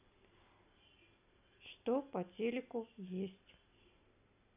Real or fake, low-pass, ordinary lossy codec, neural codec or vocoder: real; 3.6 kHz; none; none